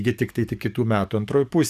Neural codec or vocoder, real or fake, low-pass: codec, 44.1 kHz, 7.8 kbps, DAC; fake; 14.4 kHz